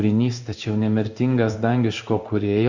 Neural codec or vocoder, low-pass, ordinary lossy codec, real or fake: codec, 16 kHz in and 24 kHz out, 1 kbps, XY-Tokenizer; 7.2 kHz; Opus, 64 kbps; fake